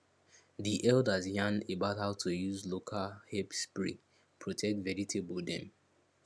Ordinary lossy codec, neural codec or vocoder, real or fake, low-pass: none; none; real; none